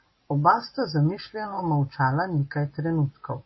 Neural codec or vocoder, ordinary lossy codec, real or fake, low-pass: none; MP3, 24 kbps; real; 7.2 kHz